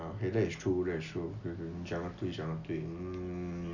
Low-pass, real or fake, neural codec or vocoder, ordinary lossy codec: 7.2 kHz; real; none; none